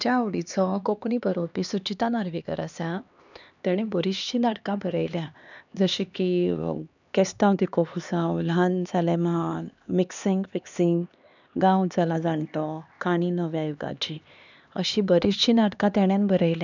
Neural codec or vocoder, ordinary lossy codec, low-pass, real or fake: codec, 16 kHz, 2 kbps, X-Codec, HuBERT features, trained on LibriSpeech; none; 7.2 kHz; fake